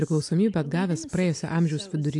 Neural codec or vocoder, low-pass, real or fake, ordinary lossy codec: none; 10.8 kHz; real; AAC, 64 kbps